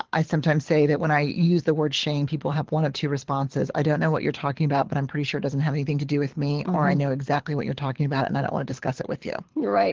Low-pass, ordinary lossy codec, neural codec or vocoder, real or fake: 7.2 kHz; Opus, 16 kbps; codec, 24 kHz, 6 kbps, HILCodec; fake